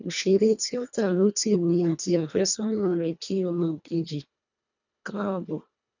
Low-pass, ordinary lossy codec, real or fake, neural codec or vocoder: 7.2 kHz; none; fake; codec, 24 kHz, 1.5 kbps, HILCodec